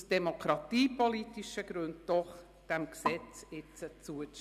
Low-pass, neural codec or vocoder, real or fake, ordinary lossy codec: 14.4 kHz; none; real; none